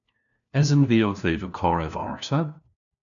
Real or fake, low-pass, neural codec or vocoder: fake; 7.2 kHz; codec, 16 kHz, 1 kbps, FunCodec, trained on LibriTTS, 50 frames a second